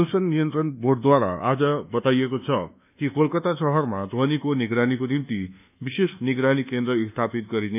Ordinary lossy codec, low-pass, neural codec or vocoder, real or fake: none; 3.6 kHz; codec, 24 kHz, 1.2 kbps, DualCodec; fake